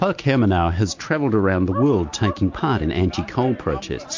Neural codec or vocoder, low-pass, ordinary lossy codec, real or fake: none; 7.2 kHz; MP3, 48 kbps; real